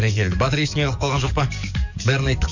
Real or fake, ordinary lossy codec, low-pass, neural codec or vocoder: fake; none; 7.2 kHz; codec, 44.1 kHz, 7.8 kbps, DAC